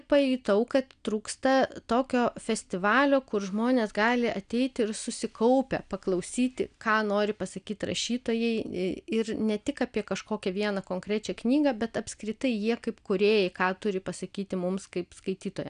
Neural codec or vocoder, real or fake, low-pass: none; real; 9.9 kHz